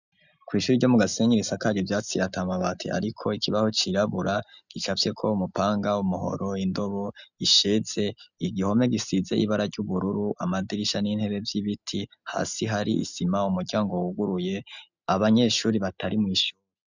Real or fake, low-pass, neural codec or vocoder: real; 7.2 kHz; none